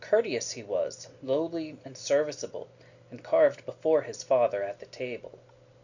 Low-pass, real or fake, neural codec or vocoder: 7.2 kHz; real; none